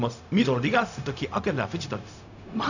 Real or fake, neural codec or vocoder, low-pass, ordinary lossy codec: fake; codec, 16 kHz, 0.4 kbps, LongCat-Audio-Codec; 7.2 kHz; none